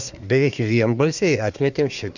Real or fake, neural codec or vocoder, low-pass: fake; codec, 24 kHz, 1 kbps, SNAC; 7.2 kHz